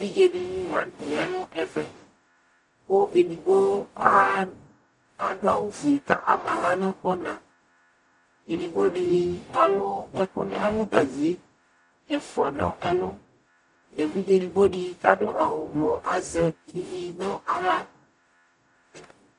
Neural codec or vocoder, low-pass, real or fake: codec, 44.1 kHz, 0.9 kbps, DAC; 10.8 kHz; fake